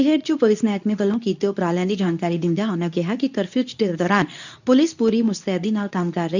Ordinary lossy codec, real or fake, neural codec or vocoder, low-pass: none; fake; codec, 24 kHz, 0.9 kbps, WavTokenizer, medium speech release version 2; 7.2 kHz